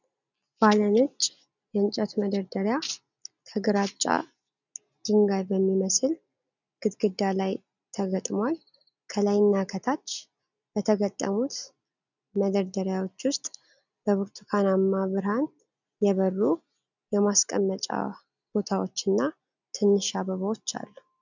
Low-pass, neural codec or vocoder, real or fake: 7.2 kHz; none; real